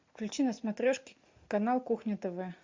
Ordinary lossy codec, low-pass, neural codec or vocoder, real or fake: MP3, 64 kbps; 7.2 kHz; none; real